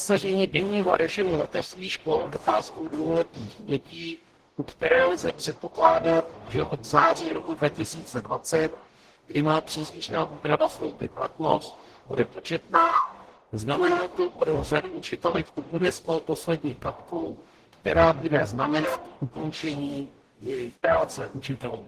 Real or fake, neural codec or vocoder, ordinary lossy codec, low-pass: fake; codec, 44.1 kHz, 0.9 kbps, DAC; Opus, 16 kbps; 14.4 kHz